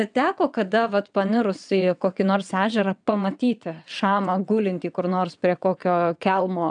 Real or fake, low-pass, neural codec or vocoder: fake; 9.9 kHz; vocoder, 22.05 kHz, 80 mel bands, WaveNeXt